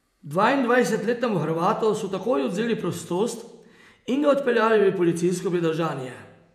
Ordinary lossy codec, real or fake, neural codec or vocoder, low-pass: none; fake; vocoder, 48 kHz, 128 mel bands, Vocos; 14.4 kHz